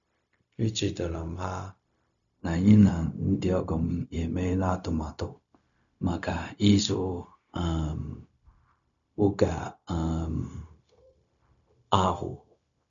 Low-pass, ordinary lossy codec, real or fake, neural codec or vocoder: 7.2 kHz; none; fake; codec, 16 kHz, 0.4 kbps, LongCat-Audio-Codec